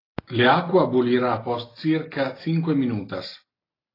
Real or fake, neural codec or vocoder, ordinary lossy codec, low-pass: real; none; AAC, 24 kbps; 5.4 kHz